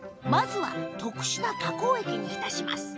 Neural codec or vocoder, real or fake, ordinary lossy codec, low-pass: none; real; none; none